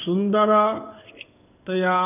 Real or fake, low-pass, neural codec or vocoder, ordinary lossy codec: fake; 3.6 kHz; codec, 16 kHz in and 24 kHz out, 1 kbps, XY-Tokenizer; none